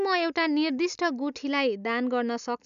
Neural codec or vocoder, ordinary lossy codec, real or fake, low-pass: none; none; real; 7.2 kHz